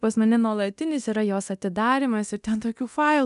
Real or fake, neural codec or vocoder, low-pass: fake; codec, 24 kHz, 0.9 kbps, DualCodec; 10.8 kHz